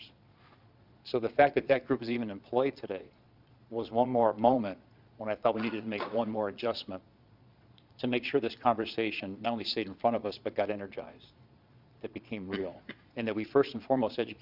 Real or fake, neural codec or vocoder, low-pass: fake; vocoder, 22.05 kHz, 80 mel bands, Vocos; 5.4 kHz